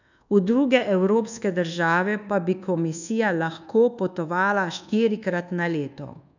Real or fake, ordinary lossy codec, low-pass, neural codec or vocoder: fake; none; 7.2 kHz; codec, 24 kHz, 1.2 kbps, DualCodec